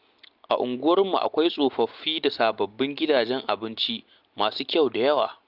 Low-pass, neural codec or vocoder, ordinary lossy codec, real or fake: 5.4 kHz; none; Opus, 24 kbps; real